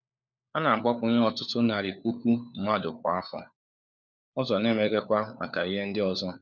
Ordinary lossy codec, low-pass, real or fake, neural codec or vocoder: none; 7.2 kHz; fake; codec, 16 kHz, 4 kbps, FunCodec, trained on LibriTTS, 50 frames a second